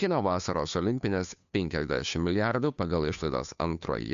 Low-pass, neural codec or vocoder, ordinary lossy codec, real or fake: 7.2 kHz; codec, 16 kHz, 2 kbps, FunCodec, trained on Chinese and English, 25 frames a second; MP3, 64 kbps; fake